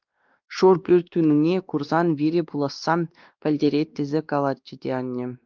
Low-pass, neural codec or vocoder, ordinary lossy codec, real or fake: 7.2 kHz; codec, 16 kHz, 2 kbps, X-Codec, WavLM features, trained on Multilingual LibriSpeech; Opus, 24 kbps; fake